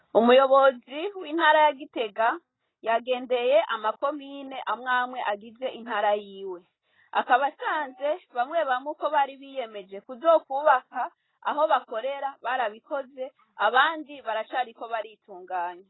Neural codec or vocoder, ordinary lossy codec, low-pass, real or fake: none; AAC, 16 kbps; 7.2 kHz; real